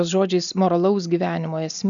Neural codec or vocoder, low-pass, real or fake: none; 7.2 kHz; real